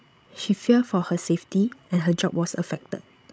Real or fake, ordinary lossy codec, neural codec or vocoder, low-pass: fake; none; codec, 16 kHz, 16 kbps, FreqCodec, larger model; none